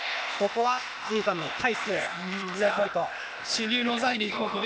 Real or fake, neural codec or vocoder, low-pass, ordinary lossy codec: fake; codec, 16 kHz, 0.8 kbps, ZipCodec; none; none